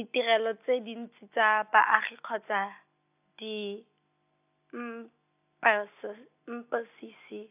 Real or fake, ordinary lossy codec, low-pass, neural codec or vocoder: real; AAC, 32 kbps; 3.6 kHz; none